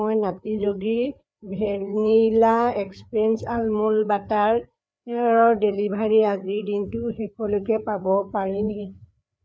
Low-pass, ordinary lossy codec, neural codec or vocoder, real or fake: none; none; codec, 16 kHz, 4 kbps, FreqCodec, larger model; fake